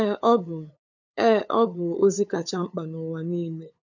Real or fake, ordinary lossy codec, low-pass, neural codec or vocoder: fake; none; 7.2 kHz; codec, 16 kHz, 8 kbps, FunCodec, trained on LibriTTS, 25 frames a second